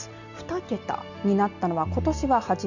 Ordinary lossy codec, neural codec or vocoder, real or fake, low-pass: none; none; real; 7.2 kHz